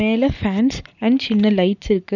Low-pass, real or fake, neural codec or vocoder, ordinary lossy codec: 7.2 kHz; real; none; none